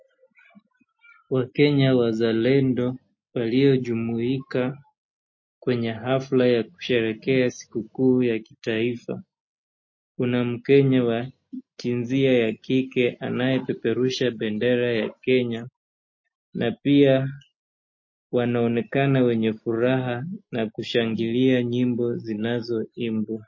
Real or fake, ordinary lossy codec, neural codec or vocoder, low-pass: real; MP3, 32 kbps; none; 7.2 kHz